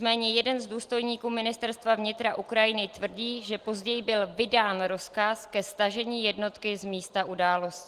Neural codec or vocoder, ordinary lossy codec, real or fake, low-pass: none; Opus, 24 kbps; real; 14.4 kHz